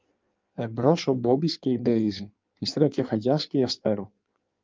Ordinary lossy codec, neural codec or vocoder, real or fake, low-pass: Opus, 24 kbps; codec, 16 kHz in and 24 kHz out, 1.1 kbps, FireRedTTS-2 codec; fake; 7.2 kHz